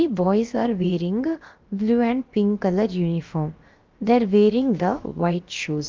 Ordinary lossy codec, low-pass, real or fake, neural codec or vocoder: Opus, 32 kbps; 7.2 kHz; fake; codec, 16 kHz, about 1 kbps, DyCAST, with the encoder's durations